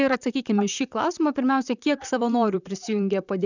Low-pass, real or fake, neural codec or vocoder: 7.2 kHz; fake; vocoder, 44.1 kHz, 128 mel bands, Pupu-Vocoder